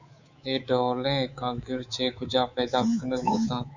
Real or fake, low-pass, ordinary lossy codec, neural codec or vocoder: fake; 7.2 kHz; Opus, 64 kbps; codec, 24 kHz, 3.1 kbps, DualCodec